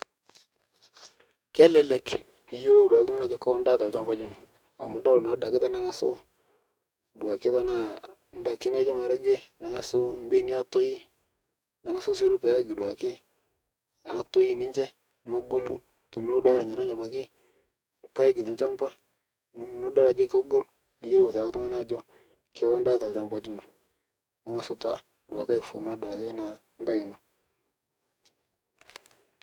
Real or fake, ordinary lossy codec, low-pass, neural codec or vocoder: fake; none; 19.8 kHz; codec, 44.1 kHz, 2.6 kbps, DAC